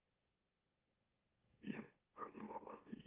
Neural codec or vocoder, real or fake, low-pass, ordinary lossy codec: autoencoder, 44.1 kHz, a latent of 192 numbers a frame, MeloTTS; fake; 3.6 kHz; Opus, 32 kbps